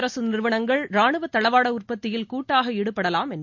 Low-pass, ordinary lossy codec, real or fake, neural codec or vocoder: 7.2 kHz; MP3, 48 kbps; real; none